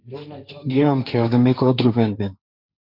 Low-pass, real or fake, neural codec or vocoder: 5.4 kHz; fake; codec, 16 kHz, 1.1 kbps, Voila-Tokenizer